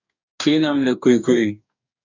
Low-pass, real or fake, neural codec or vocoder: 7.2 kHz; fake; codec, 44.1 kHz, 2.6 kbps, DAC